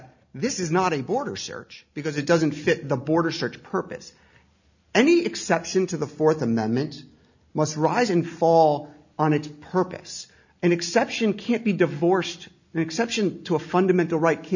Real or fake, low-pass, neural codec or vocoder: real; 7.2 kHz; none